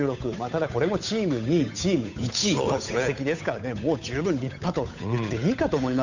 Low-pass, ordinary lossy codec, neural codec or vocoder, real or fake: 7.2 kHz; none; codec, 16 kHz, 8 kbps, FunCodec, trained on Chinese and English, 25 frames a second; fake